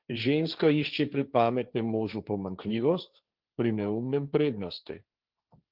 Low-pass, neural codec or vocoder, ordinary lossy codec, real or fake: 5.4 kHz; codec, 16 kHz, 1.1 kbps, Voila-Tokenizer; Opus, 32 kbps; fake